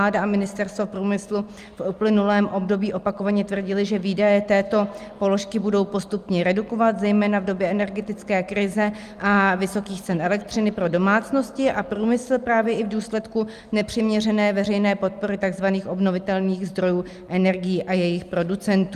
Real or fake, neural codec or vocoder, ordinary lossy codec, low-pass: real; none; Opus, 32 kbps; 14.4 kHz